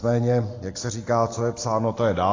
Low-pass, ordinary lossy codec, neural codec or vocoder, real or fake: 7.2 kHz; AAC, 32 kbps; none; real